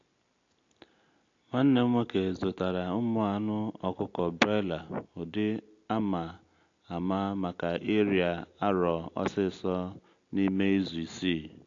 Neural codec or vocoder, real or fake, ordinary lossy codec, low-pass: none; real; AAC, 48 kbps; 7.2 kHz